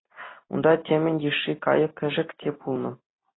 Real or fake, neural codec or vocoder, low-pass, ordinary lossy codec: real; none; 7.2 kHz; AAC, 16 kbps